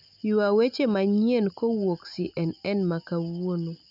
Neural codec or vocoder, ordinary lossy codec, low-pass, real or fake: none; none; 5.4 kHz; real